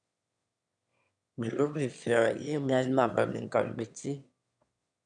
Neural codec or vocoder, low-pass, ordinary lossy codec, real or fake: autoencoder, 22.05 kHz, a latent of 192 numbers a frame, VITS, trained on one speaker; 9.9 kHz; MP3, 96 kbps; fake